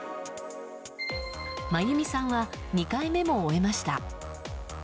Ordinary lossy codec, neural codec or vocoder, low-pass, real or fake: none; none; none; real